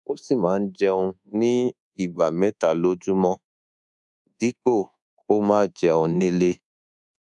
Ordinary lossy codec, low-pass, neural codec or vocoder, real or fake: none; 10.8 kHz; codec, 24 kHz, 1.2 kbps, DualCodec; fake